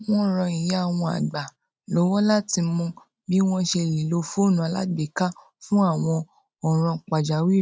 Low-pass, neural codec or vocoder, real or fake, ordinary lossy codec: none; none; real; none